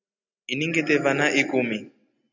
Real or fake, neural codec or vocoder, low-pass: real; none; 7.2 kHz